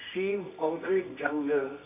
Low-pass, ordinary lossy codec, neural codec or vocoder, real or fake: 3.6 kHz; AAC, 32 kbps; codec, 24 kHz, 0.9 kbps, WavTokenizer, medium music audio release; fake